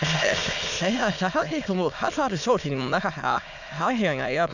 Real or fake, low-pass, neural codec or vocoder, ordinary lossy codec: fake; 7.2 kHz; autoencoder, 22.05 kHz, a latent of 192 numbers a frame, VITS, trained on many speakers; none